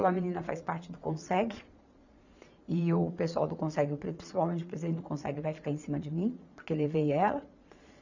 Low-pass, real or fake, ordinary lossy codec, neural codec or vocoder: 7.2 kHz; fake; none; vocoder, 22.05 kHz, 80 mel bands, Vocos